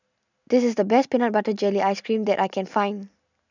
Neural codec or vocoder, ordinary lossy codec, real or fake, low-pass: none; none; real; 7.2 kHz